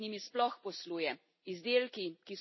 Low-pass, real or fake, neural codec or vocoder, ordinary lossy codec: 7.2 kHz; real; none; MP3, 24 kbps